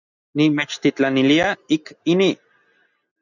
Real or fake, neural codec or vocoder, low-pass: real; none; 7.2 kHz